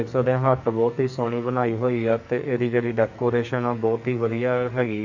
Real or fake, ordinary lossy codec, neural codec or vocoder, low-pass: fake; none; codec, 44.1 kHz, 2.6 kbps, SNAC; 7.2 kHz